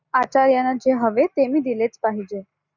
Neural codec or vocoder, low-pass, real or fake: none; 7.2 kHz; real